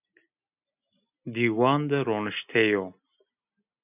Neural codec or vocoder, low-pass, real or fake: none; 3.6 kHz; real